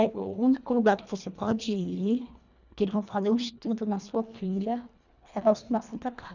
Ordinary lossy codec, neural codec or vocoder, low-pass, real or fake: none; codec, 24 kHz, 1.5 kbps, HILCodec; 7.2 kHz; fake